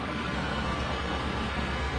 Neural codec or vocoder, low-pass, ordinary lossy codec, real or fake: codec, 44.1 kHz, 2.6 kbps, SNAC; 9.9 kHz; Opus, 24 kbps; fake